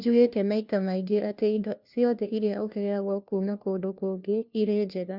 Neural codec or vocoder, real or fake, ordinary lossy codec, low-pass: codec, 16 kHz, 1 kbps, FunCodec, trained on LibriTTS, 50 frames a second; fake; none; 5.4 kHz